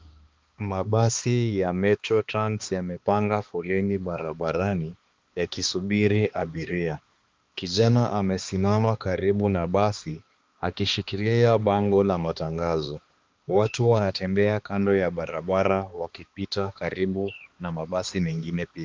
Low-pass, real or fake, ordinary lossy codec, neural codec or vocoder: 7.2 kHz; fake; Opus, 32 kbps; codec, 16 kHz, 2 kbps, X-Codec, HuBERT features, trained on balanced general audio